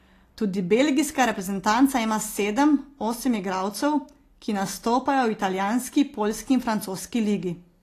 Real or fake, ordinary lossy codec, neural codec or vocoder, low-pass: real; AAC, 48 kbps; none; 14.4 kHz